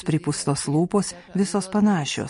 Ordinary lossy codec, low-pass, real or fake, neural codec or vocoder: MP3, 48 kbps; 10.8 kHz; real; none